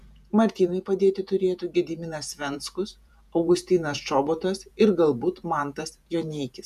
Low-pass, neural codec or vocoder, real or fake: 14.4 kHz; vocoder, 48 kHz, 128 mel bands, Vocos; fake